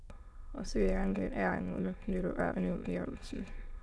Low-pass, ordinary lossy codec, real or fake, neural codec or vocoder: none; none; fake; autoencoder, 22.05 kHz, a latent of 192 numbers a frame, VITS, trained on many speakers